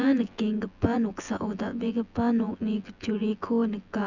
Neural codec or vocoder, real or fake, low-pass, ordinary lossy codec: vocoder, 24 kHz, 100 mel bands, Vocos; fake; 7.2 kHz; none